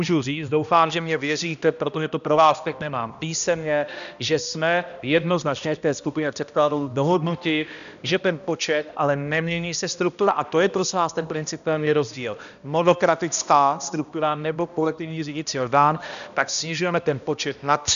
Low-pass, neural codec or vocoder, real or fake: 7.2 kHz; codec, 16 kHz, 1 kbps, X-Codec, HuBERT features, trained on balanced general audio; fake